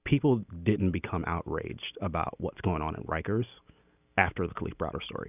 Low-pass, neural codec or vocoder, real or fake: 3.6 kHz; none; real